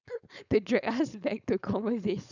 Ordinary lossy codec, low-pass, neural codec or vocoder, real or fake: none; 7.2 kHz; codec, 16 kHz, 4.8 kbps, FACodec; fake